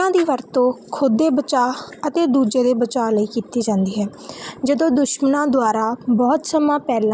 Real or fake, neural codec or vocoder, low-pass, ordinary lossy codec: real; none; none; none